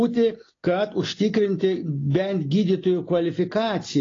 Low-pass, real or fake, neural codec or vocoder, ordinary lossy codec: 7.2 kHz; real; none; AAC, 32 kbps